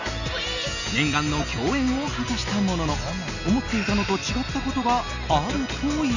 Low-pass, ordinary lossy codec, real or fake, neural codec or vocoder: 7.2 kHz; none; real; none